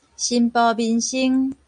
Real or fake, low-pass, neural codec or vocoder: real; 9.9 kHz; none